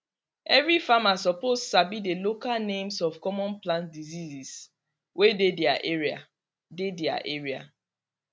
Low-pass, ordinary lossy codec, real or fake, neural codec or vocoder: none; none; real; none